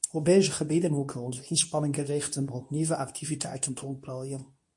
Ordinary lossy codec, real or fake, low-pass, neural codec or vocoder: MP3, 48 kbps; fake; 10.8 kHz; codec, 24 kHz, 0.9 kbps, WavTokenizer, medium speech release version 2